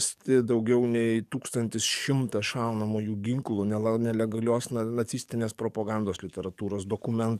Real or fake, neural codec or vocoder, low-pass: fake; codec, 44.1 kHz, 7.8 kbps, DAC; 14.4 kHz